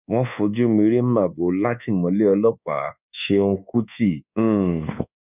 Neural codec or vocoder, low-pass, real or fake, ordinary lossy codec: codec, 24 kHz, 1.2 kbps, DualCodec; 3.6 kHz; fake; none